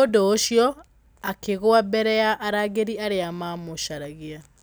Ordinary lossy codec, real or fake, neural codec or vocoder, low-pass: none; real; none; none